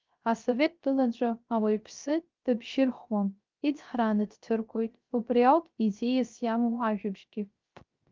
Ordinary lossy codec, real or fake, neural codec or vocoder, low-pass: Opus, 32 kbps; fake; codec, 16 kHz, 0.3 kbps, FocalCodec; 7.2 kHz